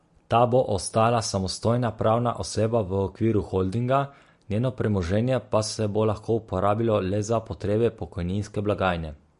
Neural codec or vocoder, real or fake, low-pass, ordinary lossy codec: none; real; 10.8 kHz; MP3, 48 kbps